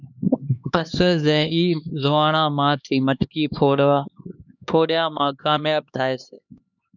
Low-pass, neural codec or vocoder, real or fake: 7.2 kHz; codec, 16 kHz, 2 kbps, X-Codec, HuBERT features, trained on LibriSpeech; fake